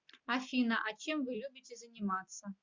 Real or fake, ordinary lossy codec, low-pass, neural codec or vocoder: real; MP3, 64 kbps; 7.2 kHz; none